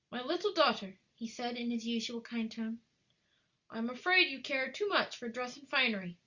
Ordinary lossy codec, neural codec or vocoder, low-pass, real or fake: Opus, 64 kbps; none; 7.2 kHz; real